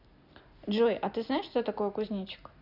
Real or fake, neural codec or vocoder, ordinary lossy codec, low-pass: real; none; none; 5.4 kHz